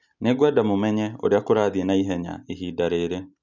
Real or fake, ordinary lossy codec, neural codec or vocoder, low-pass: real; none; none; 7.2 kHz